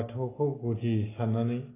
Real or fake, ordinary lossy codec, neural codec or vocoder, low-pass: real; AAC, 16 kbps; none; 3.6 kHz